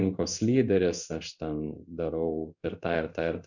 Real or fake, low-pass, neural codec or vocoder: fake; 7.2 kHz; codec, 16 kHz in and 24 kHz out, 1 kbps, XY-Tokenizer